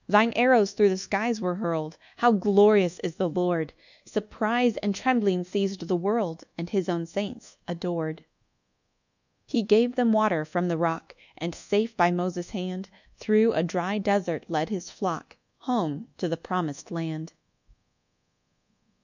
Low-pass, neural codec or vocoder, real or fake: 7.2 kHz; codec, 24 kHz, 1.2 kbps, DualCodec; fake